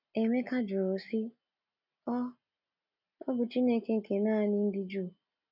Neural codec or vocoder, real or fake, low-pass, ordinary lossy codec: none; real; 5.4 kHz; none